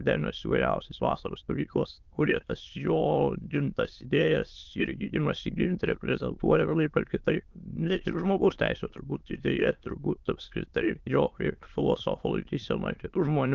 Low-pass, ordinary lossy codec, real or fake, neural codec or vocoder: 7.2 kHz; Opus, 32 kbps; fake; autoencoder, 22.05 kHz, a latent of 192 numbers a frame, VITS, trained on many speakers